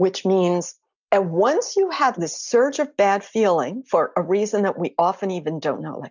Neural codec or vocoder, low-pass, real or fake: none; 7.2 kHz; real